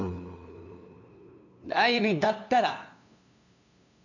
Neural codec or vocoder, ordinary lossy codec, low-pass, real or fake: codec, 16 kHz, 2 kbps, FunCodec, trained on LibriTTS, 25 frames a second; none; 7.2 kHz; fake